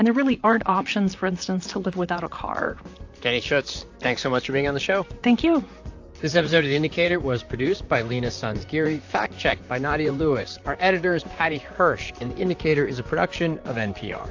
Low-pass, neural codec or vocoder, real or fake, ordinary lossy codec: 7.2 kHz; vocoder, 44.1 kHz, 128 mel bands, Pupu-Vocoder; fake; AAC, 48 kbps